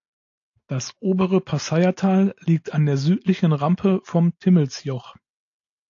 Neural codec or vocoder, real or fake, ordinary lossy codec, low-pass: none; real; AAC, 48 kbps; 7.2 kHz